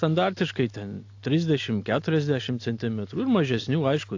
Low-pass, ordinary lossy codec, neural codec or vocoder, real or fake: 7.2 kHz; AAC, 48 kbps; none; real